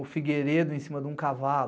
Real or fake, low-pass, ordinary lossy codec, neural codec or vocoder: real; none; none; none